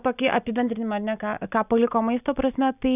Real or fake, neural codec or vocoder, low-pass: real; none; 3.6 kHz